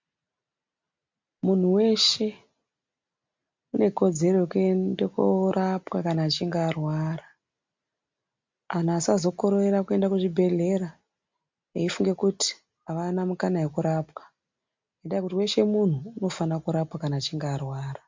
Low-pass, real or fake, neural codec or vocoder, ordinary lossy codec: 7.2 kHz; real; none; MP3, 64 kbps